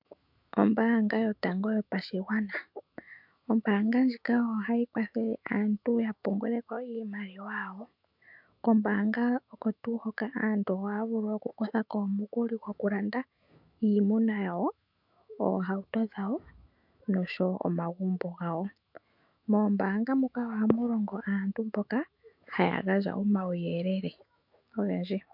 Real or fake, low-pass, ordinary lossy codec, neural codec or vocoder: fake; 5.4 kHz; MP3, 48 kbps; autoencoder, 48 kHz, 128 numbers a frame, DAC-VAE, trained on Japanese speech